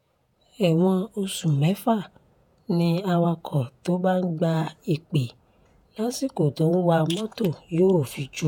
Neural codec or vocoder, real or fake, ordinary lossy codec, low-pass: vocoder, 48 kHz, 128 mel bands, Vocos; fake; none; 19.8 kHz